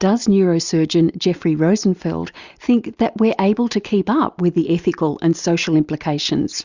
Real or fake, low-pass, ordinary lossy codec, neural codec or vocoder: real; 7.2 kHz; Opus, 64 kbps; none